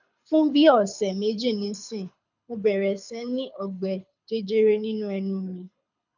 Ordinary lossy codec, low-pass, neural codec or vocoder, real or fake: none; 7.2 kHz; codec, 24 kHz, 6 kbps, HILCodec; fake